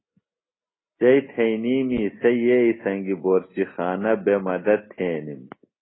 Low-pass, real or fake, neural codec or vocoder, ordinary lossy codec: 7.2 kHz; real; none; AAC, 16 kbps